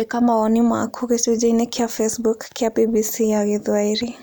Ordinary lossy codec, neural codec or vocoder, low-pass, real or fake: none; none; none; real